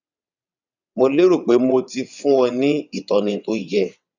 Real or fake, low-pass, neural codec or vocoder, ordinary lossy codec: fake; 7.2 kHz; vocoder, 22.05 kHz, 80 mel bands, WaveNeXt; none